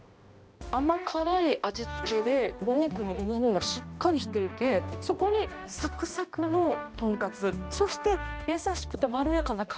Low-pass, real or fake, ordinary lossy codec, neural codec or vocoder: none; fake; none; codec, 16 kHz, 1 kbps, X-Codec, HuBERT features, trained on balanced general audio